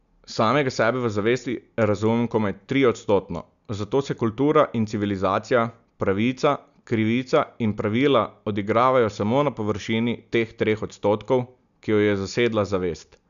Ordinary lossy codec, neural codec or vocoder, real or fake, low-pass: none; none; real; 7.2 kHz